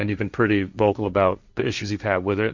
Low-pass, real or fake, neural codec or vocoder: 7.2 kHz; fake; codec, 16 kHz, 1.1 kbps, Voila-Tokenizer